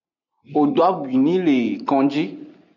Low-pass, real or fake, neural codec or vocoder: 7.2 kHz; real; none